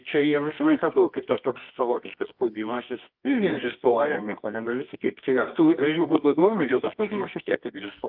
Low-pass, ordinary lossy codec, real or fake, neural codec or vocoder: 5.4 kHz; Opus, 24 kbps; fake; codec, 24 kHz, 0.9 kbps, WavTokenizer, medium music audio release